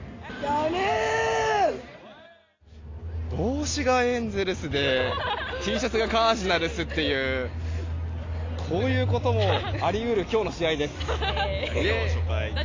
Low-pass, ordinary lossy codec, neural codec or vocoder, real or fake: 7.2 kHz; AAC, 32 kbps; none; real